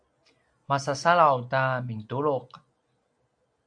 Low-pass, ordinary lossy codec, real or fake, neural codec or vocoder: 9.9 kHz; AAC, 64 kbps; real; none